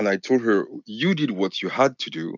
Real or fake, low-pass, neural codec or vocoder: real; 7.2 kHz; none